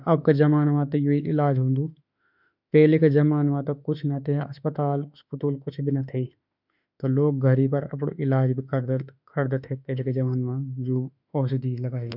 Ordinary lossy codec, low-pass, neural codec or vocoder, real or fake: none; 5.4 kHz; autoencoder, 48 kHz, 32 numbers a frame, DAC-VAE, trained on Japanese speech; fake